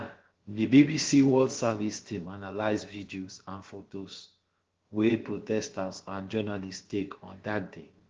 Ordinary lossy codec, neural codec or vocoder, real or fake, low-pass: Opus, 16 kbps; codec, 16 kHz, about 1 kbps, DyCAST, with the encoder's durations; fake; 7.2 kHz